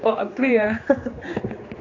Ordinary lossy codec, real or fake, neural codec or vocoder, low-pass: AAC, 48 kbps; fake; codec, 16 kHz, 2 kbps, X-Codec, HuBERT features, trained on general audio; 7.2 kHz